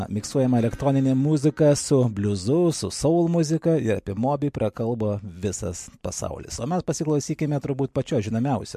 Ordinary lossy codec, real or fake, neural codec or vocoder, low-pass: MP3, 64 kbps; real; none; 14.4 kHz